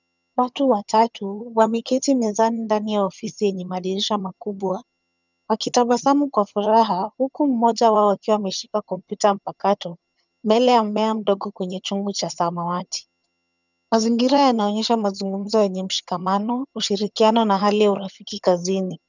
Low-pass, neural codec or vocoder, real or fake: 7.2 kHz; vocoder, 22.05 kHz, 80 mel bands, HiFi-GAN; fake